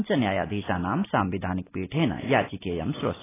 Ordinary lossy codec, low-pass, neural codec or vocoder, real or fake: AAC, 16 kbps; 3.6 kHz; none; real